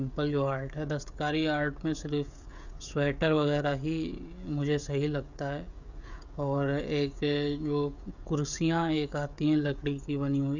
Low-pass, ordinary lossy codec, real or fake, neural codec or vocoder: 7.2 kHz; none; fake; codec, 16 kHz, 16 kbps, FreqCodec, smaller model